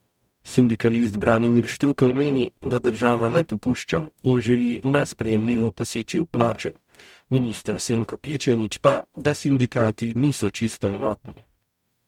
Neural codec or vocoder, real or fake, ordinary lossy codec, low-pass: codec, 44.1 kHz, 0.9 kbps, DAC; fake; MP3, 96 kbps; 19.8 kHz